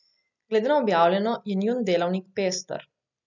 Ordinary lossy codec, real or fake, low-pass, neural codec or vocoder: none; real; 7.2 kHz; none